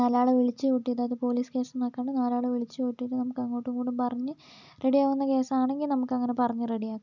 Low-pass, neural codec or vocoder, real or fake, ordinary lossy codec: 7.2 kHz; none; real; none